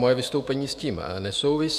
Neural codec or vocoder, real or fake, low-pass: autoencoder, 48 kHz, 128 numbers a frame, DAC-VAE, trained on Japanese speech; fake; 14.4 kHz